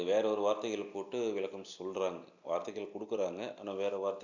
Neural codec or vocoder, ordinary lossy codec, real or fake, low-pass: none; none; real; 7.2 kHz